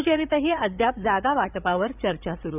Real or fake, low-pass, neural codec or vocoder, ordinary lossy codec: fake; 3.6 kHz; codec, 16 kHz, 8 kbps, FreqCodec, larger model; none